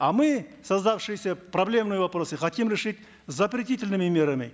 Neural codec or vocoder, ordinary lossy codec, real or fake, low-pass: none; none; real; none